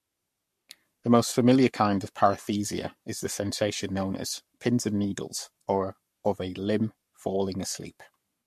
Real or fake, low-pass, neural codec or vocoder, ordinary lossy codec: fake; 14.4 kHz; codec, 44.1 kHz, 7.8 kbps, Pupu-Codec; MP3, 64 kbps